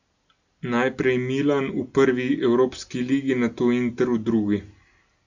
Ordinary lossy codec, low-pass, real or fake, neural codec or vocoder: Opus, 64 kbps; 7.2 kHz; real; none